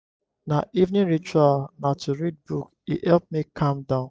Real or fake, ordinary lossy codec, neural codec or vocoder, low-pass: real; none; none; none